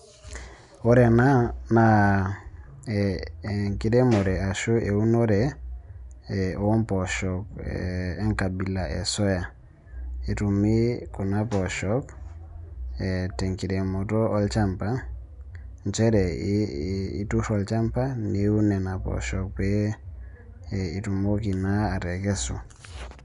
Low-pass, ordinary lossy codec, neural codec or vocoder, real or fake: 10.8 kHz; none; none; real